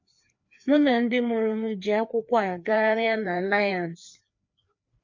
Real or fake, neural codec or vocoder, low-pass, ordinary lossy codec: fake; codec, 16 kHz, 2 kbps, FreqCodec, larger model; 7.2 kHz; MP3, 48 kbps